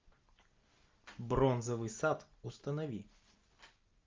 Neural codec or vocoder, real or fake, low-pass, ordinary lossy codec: none; real; 7.2 kHz; Opus, 24 kbps